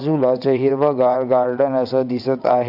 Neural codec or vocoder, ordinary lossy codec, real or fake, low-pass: vocoder, 22.05 kHz, 80 mel bands, WaveNeXt; none; fake; 5.4 kHz